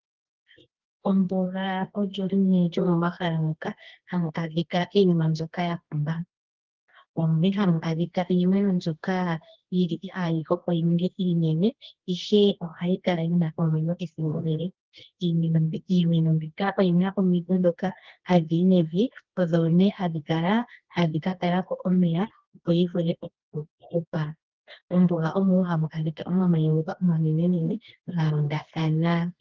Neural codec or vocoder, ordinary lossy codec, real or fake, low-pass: codec, 24 kHz, 0.9 kbps, WavTokenizer, medium music audio release; Opus, 16 kbps; fake; 7.2 kHz